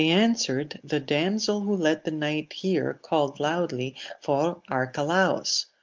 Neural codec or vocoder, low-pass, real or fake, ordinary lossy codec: none; 7.2 kHz; real; Opus, 32 kbps